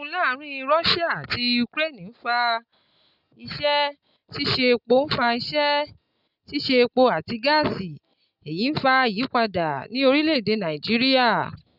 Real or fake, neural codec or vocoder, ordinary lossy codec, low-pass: real; none; none; 5.4 kHz